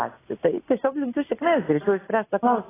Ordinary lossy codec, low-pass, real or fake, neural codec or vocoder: AAC, 16 kbps; 3.6 kHz; real; none